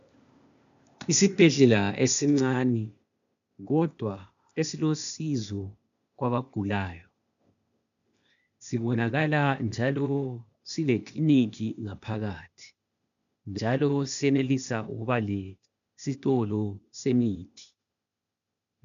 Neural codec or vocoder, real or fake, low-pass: codec, 16 kHz, 0.8 kbps, ZipCodec; fake; 7.2 kHz